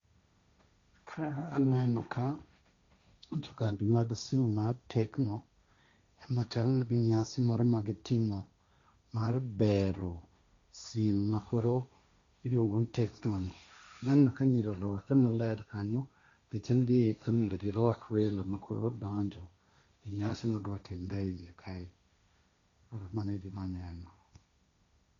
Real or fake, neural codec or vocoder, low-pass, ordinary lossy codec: fake; codec, 16 kHz, 1.1 kbps, Voila-Tokenizer; 7.2 kHz; Opus, 64 kbps